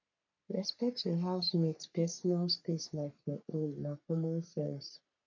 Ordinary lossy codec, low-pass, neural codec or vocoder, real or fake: none; 7.2 kHz; codec, 44.1 kHz, 3.4 kbps, Pupu-Codec; fake